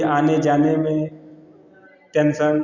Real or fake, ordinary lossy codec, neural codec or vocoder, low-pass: real; Opus, 64 kbps; none; 7.2 kHz